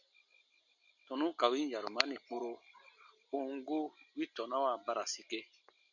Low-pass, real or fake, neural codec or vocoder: 7.2 kHz; real; none